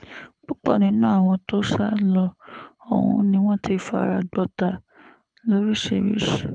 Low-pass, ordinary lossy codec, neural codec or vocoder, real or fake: 9.9 kHz; none; codec, 24 kHz, 6 kbps, HILCodec; fake